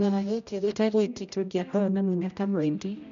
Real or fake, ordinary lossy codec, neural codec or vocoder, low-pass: fake; none; codec, 16 kHz, 0.5 kbps, X-Codec, HuBERT features, trained on general audio; 7.2 kHz